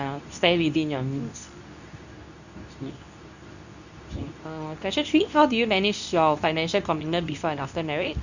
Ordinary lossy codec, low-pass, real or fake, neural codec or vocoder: none; 7.2 kHz; fake; codec, 24 kHz, 0.9 kbps, WavTokenizer, medium speech release version 2